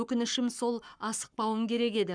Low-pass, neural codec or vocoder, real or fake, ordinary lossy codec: 9.9 kHz; codec, 44.1 kHz, 7.8 kbps, Pupu-Codec; fake; none